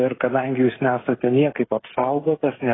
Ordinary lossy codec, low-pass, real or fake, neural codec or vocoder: AAC, 16 kbps; 7.2 kHz; fake; codec, 16 kHz, 16 kbps, FreqCodec, smaller model